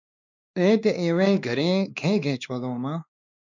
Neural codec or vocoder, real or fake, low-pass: codec, 16 kHz, 2 kbps, X-Codec, WavLM features, trained on Multilingual LibriSpeech; fake; 7.2 kHz